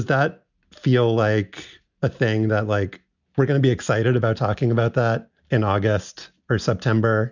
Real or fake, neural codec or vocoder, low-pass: real; none; 7.2 kHz